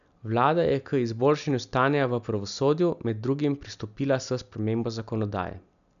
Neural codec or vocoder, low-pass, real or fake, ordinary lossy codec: none; 7.2 kHz; real; none